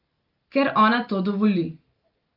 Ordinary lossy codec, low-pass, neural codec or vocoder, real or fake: Opus, 32 kbps; 5.4 kHz; none; real